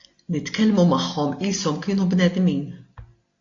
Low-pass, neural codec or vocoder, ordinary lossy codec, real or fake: 7.2 kHz; none; AAC, 48 kbps; real